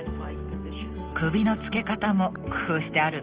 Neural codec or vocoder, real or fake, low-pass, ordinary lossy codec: none; real; 3.6 kHz; Opus, 16 kbps